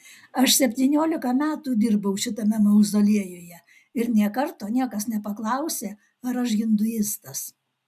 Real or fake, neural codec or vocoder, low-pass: real; none; 14.4 kHz